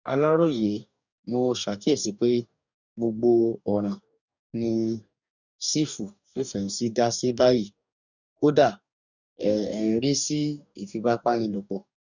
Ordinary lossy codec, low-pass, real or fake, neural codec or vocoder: none; 7.2 kHz; fake; codec, 44.1 kHz, 2.6 kbps, DAC